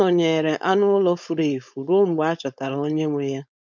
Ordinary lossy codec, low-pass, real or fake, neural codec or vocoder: none; none; fake; codec, 16 kHz, 4.8 kbps, FACodec